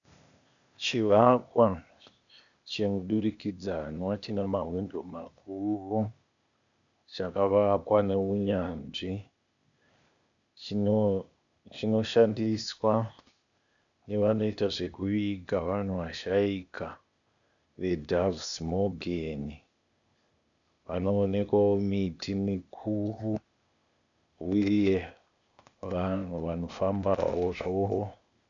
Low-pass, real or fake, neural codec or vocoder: 7.2 kHz; fake; codec, 16 kHz, 0.8 kbps, ZipCodec